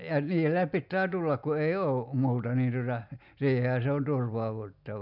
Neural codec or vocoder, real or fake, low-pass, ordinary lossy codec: none; real; 5.4 kHz; none